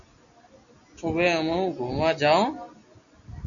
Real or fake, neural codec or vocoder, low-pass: real; none; 7.2 kHz